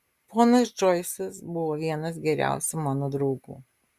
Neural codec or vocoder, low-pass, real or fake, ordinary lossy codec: none; 14.4 kHz; real; Opus, 64 kbps